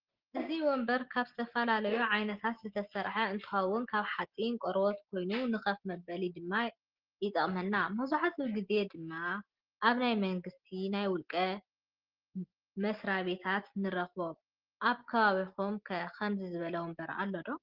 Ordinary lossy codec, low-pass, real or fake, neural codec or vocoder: Opus, 16 kbps; 5.4 kHz; real; none